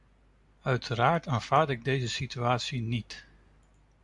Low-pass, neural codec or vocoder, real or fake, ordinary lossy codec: 10.8 kHz; none; real; AAC, 64 kbps